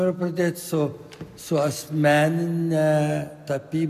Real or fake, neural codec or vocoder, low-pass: real; none; 14.4 kHz